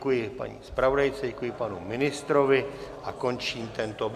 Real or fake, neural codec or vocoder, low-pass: real; none; 14.4 kHz